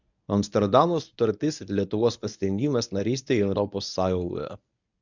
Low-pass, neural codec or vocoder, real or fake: 7.2 kHz; codec, 24 kHz, 0.9 kbps, WavTokenizer, medium speech release version 1; fake